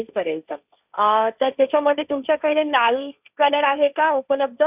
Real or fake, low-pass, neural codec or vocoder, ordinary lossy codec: fake; 3.6 kHz; codec, 16 kHz, 1.1 kbps, Voila-Tokenizer; none